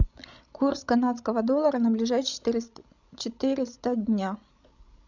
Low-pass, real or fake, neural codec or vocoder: 7.2 kHz; fake; codec, 16 kHz, 16 kbps, FreqCodec, larger model